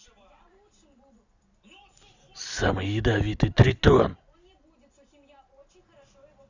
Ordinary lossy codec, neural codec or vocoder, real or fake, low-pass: Opus, 64 kbps; none; real; 7.2 kHz